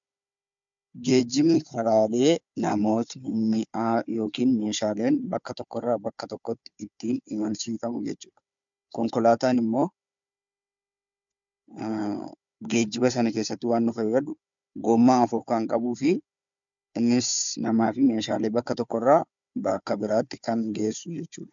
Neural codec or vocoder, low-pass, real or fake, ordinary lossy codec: codec, 16 kHz, 4 kbps, FunCodec, trained on Chinese and English, 50 frames a second; 7.2 kHz; fake; MP3, 64 kbps